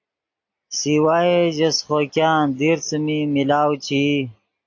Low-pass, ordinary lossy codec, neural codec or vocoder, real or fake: 7.2 kHz; AAC, 48 kbps; none; real